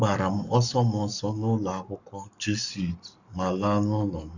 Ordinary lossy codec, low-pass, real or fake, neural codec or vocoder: none; 7.2 kHz; fake; codec, 44.1 kHz, 7.8 kbps, Pupu-Codec